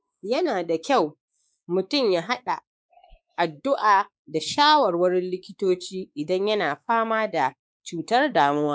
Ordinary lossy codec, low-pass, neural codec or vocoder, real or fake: none; none; codec, 16 kHz, 4 kbps, X-Codec, WavLM features, trained on Multilingual LibriSpeech; fake